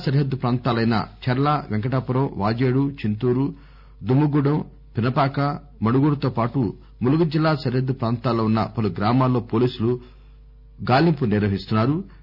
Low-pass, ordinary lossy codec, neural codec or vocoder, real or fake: 5.4 kHz; none; none; real